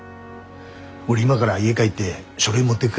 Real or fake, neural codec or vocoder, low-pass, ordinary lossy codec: real; none; none; none